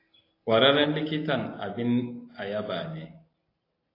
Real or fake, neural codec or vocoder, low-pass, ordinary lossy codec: real; none; 5.4 kHz; AAC, 24 kbps